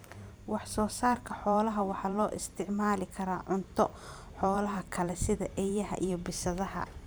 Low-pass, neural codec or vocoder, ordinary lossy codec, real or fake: none; vocoder, 44.1 kHz, 128 mel bands every 512 samples, BigVGAN v2; none; fake